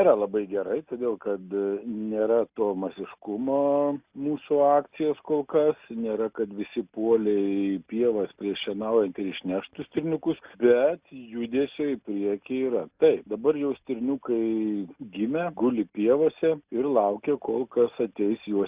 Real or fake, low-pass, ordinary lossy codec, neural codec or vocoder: real; 3.6 kHz; Opus, 64 kbps; none